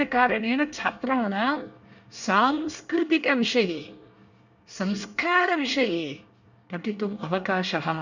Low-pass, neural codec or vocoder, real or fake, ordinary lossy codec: 7.2 kHz; codec, 24 kHz, 1 kbps, SNAC; fake; none